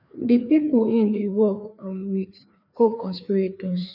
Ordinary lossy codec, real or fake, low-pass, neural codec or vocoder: none; fake; 5.4 kHz; codec, 16 kHz, 2 kbps, FreqCodec, larger model